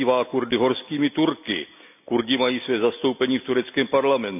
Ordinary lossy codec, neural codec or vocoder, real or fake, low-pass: none; none; real; 3.6 kHz